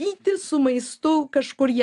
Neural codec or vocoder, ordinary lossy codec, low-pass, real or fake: none; AAC, 96 kbps; 10.8 kHz; real